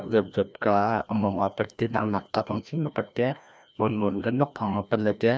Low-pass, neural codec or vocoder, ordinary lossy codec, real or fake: none; codec, 16 kHz, 1 kbps, FreqCodec, larger model; none; fake